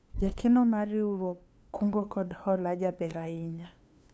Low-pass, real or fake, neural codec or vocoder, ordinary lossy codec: none; fake; codec, 16 kHz, 2 kbps, FunCodec, trained on LibriTTS, 25 frames a second; none